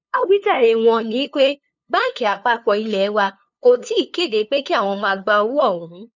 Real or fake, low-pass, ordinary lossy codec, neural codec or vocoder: fake; 7.2 kHz; none; codec, 16 kHz, 2 kbps, FunCodec, trained on LibriTTS, 25 frames a second